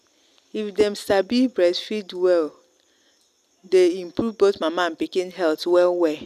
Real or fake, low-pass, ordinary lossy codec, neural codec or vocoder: real; 14.4 kHz; none; none